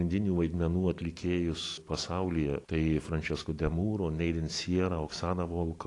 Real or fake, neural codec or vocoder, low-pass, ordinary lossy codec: real; none; 10.8 kHz; AAC, 48 kbps